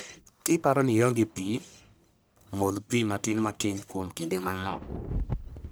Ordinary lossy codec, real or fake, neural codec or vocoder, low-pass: none; fake; codec, 44.1 kHz, 1.7 kbps, Pupu-Codec; none